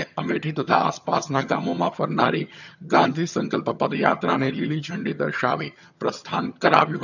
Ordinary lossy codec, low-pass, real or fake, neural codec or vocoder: none; 7.2 kHz; fake; vocoder, 22.05 kHz, 80 mel bands, HiFi-GAN